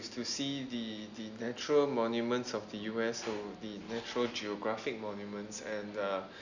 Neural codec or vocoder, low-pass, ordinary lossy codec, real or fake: none; 7.2 kHz; none; real